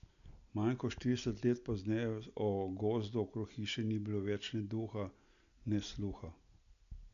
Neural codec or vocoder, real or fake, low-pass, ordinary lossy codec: none; real; 7.2 kHz; none